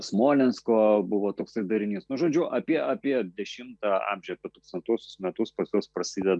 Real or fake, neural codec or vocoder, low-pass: real; none; 10.8 kHz